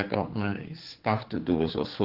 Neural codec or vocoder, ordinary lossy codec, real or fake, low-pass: codec, 16 kHz, 4 kbps, X-Codec, HuBERT features, trained on general audio; Opus, 16 kbps; fake; 5.4 kHz